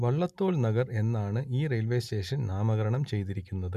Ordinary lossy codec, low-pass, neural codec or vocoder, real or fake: none; 14.4 kHz; none; real